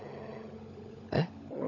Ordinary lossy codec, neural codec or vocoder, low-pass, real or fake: none; codec, 16 kHz, 16 kbps, FunCodec, trained on LibriTTS, 50 frames a second; 7.2 kHz; fake